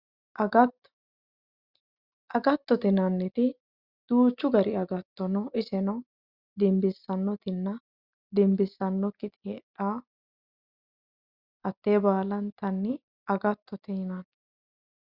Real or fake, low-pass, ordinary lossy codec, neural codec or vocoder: real; 5.4 kHz; Opus, 64 kbps; none